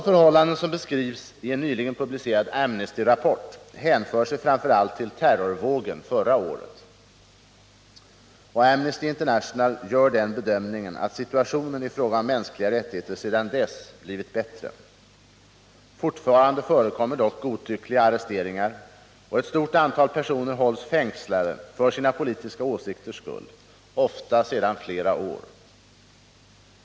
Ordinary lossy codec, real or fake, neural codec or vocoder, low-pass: none; real; none; none